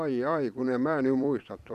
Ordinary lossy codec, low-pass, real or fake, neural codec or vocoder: none; 14.4 kHz; fake; vocoder, 44.1 kHz, 128 mel bands every 256 samples, BigVGAN v2